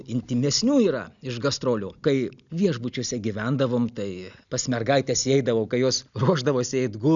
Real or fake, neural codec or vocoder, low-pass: real; none; 7.2 kHz